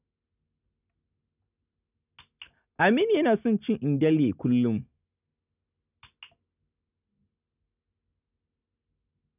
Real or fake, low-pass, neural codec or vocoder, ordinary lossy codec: real; 3.6 kHz; none; none